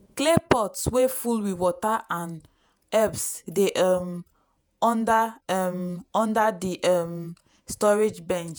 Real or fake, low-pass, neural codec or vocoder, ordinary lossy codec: fake; none; vocoder, 48 kHz, 128 mel bands, Vocos; none